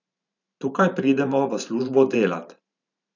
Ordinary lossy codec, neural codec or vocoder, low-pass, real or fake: none; vocoder, 44.1 kHz, 128 mel bands every 512 samples, BigVGAN v2; 7.2 kHz; fake